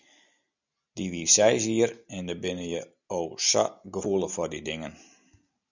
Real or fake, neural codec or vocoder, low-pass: real; none; 7.2 kHz